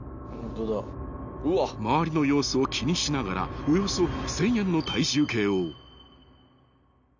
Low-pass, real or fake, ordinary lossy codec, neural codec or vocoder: 7.2 kHz; real; none; none